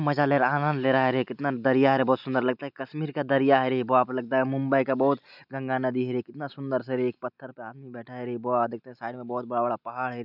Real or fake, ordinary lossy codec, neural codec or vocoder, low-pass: real; none; none; 5.4 kHz